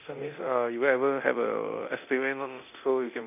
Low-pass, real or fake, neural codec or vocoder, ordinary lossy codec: 3.6 kHz; fake; codec, 24 kHz, 0.9 kbps, DualCodec; none